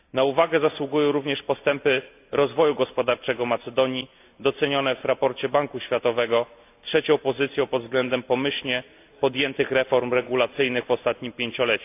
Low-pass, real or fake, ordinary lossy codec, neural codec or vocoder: 3.6 kHz; real; AAC, 32 kbps; none